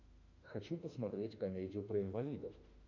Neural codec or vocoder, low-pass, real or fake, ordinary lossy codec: autoencoder, 48 kHz, 32 numbers a frame, DAC-VAE, trained on Japanese speech; 7.2 kHz; fake; MP3, 64 kbps